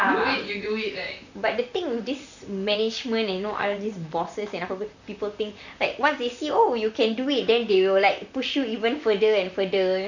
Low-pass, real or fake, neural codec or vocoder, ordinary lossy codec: 7.2 kHz; fake; vocoder, 44.1 kHz, 128 mel bands, Pupu-Vocoder; none